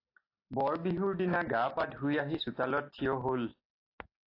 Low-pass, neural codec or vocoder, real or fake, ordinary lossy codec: 5.4 kHz; none; real; AAC, 32 kbps